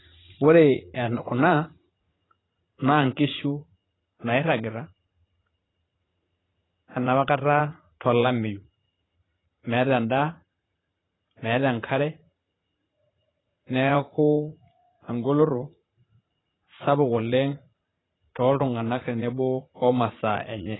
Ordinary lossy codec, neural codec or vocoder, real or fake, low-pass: AAC, 16 kbps; vocoder, 44.1 kHz, 80 mel bands, Vocos; fake; 7.2 kHz